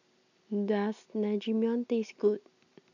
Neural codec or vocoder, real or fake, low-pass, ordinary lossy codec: none; real; 7.2 kHz; none